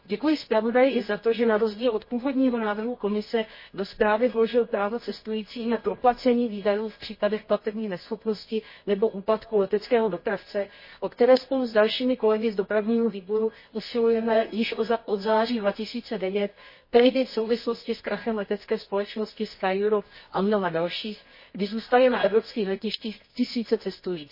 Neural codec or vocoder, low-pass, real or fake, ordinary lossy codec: codec, 24 kHz, 0.9 kbps, WavTokenizer, medium music audio release; 5.4 kHz; fake; MP3, 24 kbps